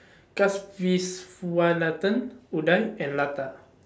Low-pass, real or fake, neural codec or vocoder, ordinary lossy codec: none; real; none; none